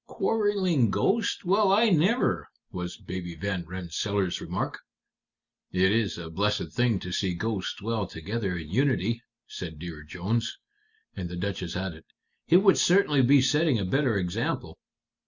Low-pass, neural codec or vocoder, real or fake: 7.2 kHz; none; real